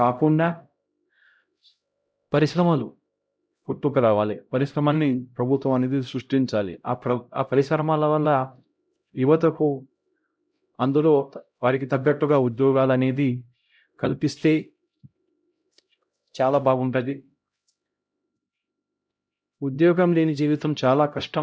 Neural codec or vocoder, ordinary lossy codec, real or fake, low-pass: codec, 16 kHz, 0.5 kbps, X-Codec, HuBERT features, trained on LibriSpeech; none; fake; none